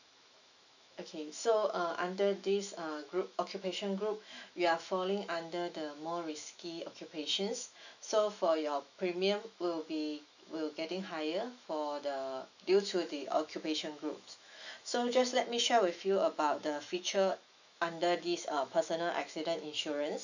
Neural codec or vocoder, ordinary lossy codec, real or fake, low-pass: autoencoder, 48 kHz, 128 numbers a frame, DAC-VAE, trained on Japanese speech; none; fake; 7.2 kHz